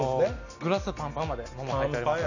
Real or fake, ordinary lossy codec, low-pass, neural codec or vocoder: real; none; 7.2 kHz; none